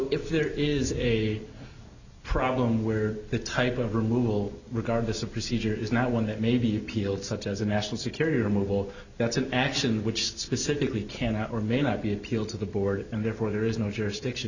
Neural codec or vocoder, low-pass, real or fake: none; 7.2 kHz; real